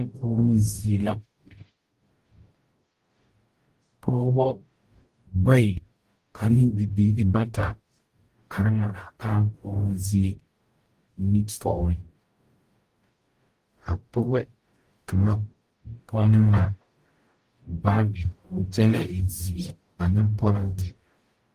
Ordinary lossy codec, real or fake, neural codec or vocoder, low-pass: Opus, 24 kbps; fake; codec, 44.1 kHz, 0.9 kbps, DAC; 14.4 kHz